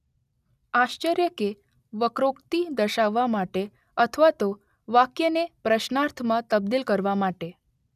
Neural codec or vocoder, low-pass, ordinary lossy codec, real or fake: none; 14.4 kHz; none; real